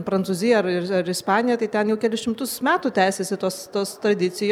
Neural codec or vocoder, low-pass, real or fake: none; 19.8 kHz; real